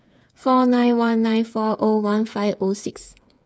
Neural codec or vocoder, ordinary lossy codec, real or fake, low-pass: codec, 16 kHz, 8 kbps, FreqCodec, smaller model; none; fake; none